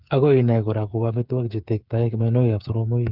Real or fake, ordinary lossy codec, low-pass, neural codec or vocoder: fake; Opus, 24 kbps; 5.4 kHz; codec, 16 kHz, 8 kbps, FreqCodec, smaller model